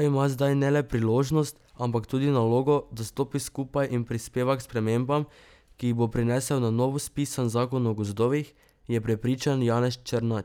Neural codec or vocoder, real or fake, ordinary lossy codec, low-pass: none; real; none; 19.8 kHz